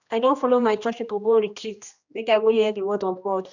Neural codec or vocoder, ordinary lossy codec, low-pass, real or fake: codec, 16 kHz, 1 kbps, X-Codec, HuBERT features, trained on general audio; none; 7.2 kHz; fake